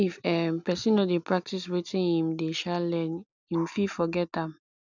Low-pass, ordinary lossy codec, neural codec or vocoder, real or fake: 7.2 kHz; none; none; real